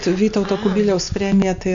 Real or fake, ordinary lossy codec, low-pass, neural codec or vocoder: real; MP3, 64 kbps; 7.2 kHz; none